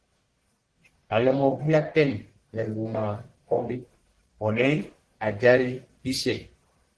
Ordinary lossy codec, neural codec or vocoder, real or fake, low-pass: Opus, 16 kbps; codec, 44.1 kHz, 1.7 kbps, Pupu-Codec; fake; 10.8 kHz